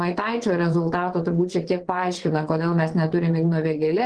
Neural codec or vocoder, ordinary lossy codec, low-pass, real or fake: vocoder, 22.05 kHz, 80 mel bands, WaveNeXt; Opus, 16 kbps; 9.9 kHz; fake